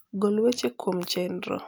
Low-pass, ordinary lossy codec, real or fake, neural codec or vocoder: none; none; real; none